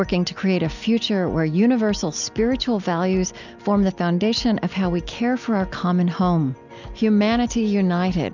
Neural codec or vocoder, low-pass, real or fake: none; 7.2 kHz; real